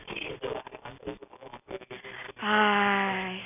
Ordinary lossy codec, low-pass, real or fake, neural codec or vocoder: none; 3.6 kHz; real; none